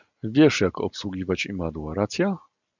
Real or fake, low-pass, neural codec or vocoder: real; 7.2 kHz; none